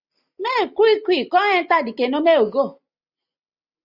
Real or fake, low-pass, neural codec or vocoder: real; 5.4 kHz; none